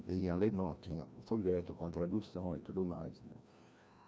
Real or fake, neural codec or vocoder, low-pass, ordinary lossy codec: fake; codec, 16 kHz, 1 kbps, FreqCodec, larger model; none; none